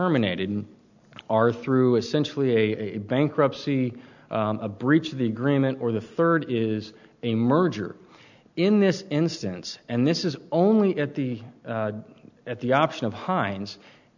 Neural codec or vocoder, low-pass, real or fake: none; 7.2 kHz; real